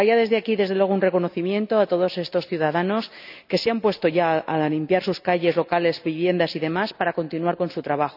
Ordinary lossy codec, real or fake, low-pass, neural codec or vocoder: none; real; 5.4 kHz; none